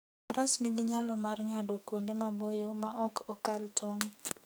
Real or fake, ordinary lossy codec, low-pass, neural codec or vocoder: fake; none; none; codec, 44.1 kHz, 2.6 kbps, SNAC